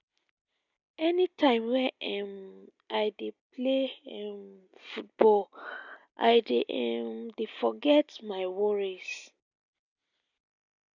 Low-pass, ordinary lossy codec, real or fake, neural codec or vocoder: 7.2 kHz; none; real; none